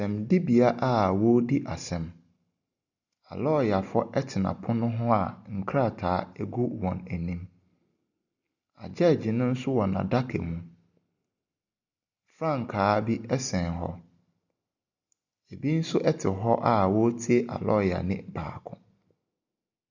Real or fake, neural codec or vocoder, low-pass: real; none; 7.2 kHz